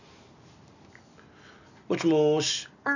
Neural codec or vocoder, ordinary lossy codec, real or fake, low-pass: none; none; real; 7.2 kHz